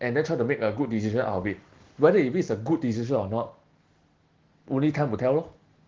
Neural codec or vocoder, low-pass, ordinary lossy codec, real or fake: none; 7.2 kHz; Opus, 16 kbps; real